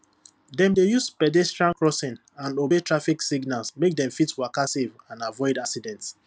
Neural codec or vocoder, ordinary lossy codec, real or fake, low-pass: none; none; real; none